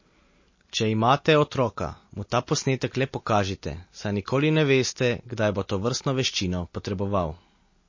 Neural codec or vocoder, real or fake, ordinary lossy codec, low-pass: none; real; MP3, 32 kbps; 7.2 kHz